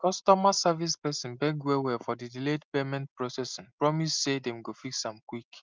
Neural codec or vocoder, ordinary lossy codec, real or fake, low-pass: none; Opus, 24 kbps; real; 7.2 kHz